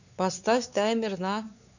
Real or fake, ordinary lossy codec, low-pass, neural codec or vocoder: fake; none; 7.2 kHz; codec, 16 kHz, 4 kbps, X-Codec, WavLM features, trained on Multilingual LibriSpeech